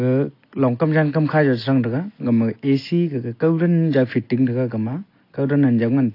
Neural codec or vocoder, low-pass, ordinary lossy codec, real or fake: none; 5.4 kHz; AAC, 32 kbps; real